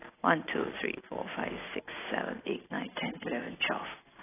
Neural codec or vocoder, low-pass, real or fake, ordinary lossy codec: none; 3.6 kHz; real; AAC, 16 kbps